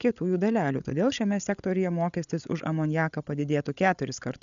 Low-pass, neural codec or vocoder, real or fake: 7.2 kHz; codec, 16 kHz, 8 kbps, FreqCodec, larger model; fake